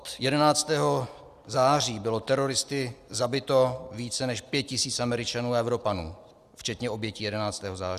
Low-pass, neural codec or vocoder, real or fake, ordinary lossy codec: 14.4 kHz; none; real; Opus, 64 kbps